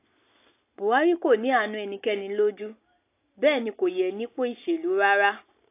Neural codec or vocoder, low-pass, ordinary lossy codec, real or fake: none; 3.6 kHz; AAC, 24 kbps; real